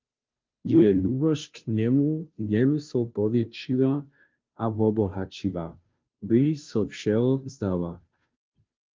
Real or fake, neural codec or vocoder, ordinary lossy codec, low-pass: fake; codec, 16 kHz, 0.5 kbps, FunCodec, trained on Chinese and English, 25 frames a second; Opus, 32 kbps; 7.2 kHz